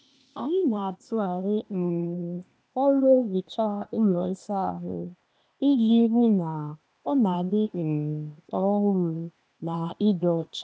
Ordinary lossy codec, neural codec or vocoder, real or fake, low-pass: none; codec, 16 kHz, 0.8 kbps, ZipCodec; fake; none